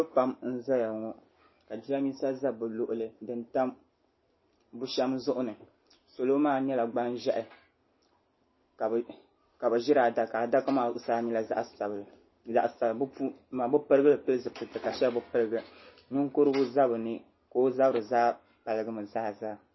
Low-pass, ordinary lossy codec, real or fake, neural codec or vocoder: 7.2 kHz; MP3, 24 kbps; real; none